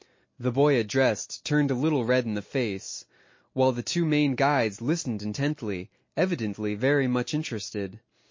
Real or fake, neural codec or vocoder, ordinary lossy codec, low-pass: real; none; MP3, 32 kbps; 7.2 kHz